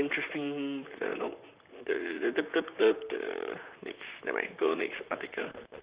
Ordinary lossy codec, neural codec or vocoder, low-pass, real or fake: Opus, 64 kbps; vocoder, 44.1 kHz, 128 mel bands, Pupu-Vocoder; 3.6 kHz; fake